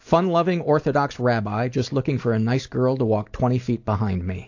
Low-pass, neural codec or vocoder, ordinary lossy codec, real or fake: 7.2 kHz; none; AAC, 48 kbps; real